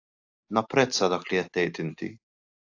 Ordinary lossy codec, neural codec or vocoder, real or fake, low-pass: AAC, 48 kbps; none; real; 7.2 kHz